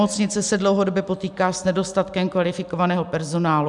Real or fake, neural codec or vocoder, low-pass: real; none; 10.8 kHz